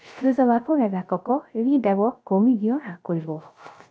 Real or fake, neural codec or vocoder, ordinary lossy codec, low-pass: fake; codec, 16 kHz, 0.3 kbps, FocalCodec; none; none